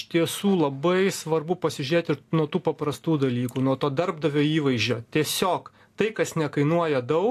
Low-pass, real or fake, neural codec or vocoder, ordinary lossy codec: 14.4 kHz; real; none; AAC, 64 kbps